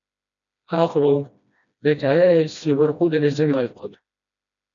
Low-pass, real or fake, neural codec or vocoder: 7.2 kHz; fake; codec, 16 kHz, 1 kbps, FreqCodec, smaller model